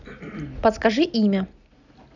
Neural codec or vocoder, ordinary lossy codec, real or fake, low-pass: none; none; real; 7.2 kHz